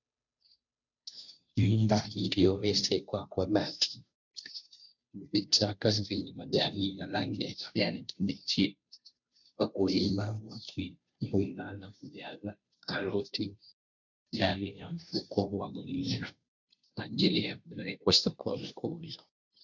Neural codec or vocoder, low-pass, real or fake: codec, 16 kHz, 0.5 kbps, FunCodec, trained on Chinese and English, 25 frames a second; 7.2 kHz; fake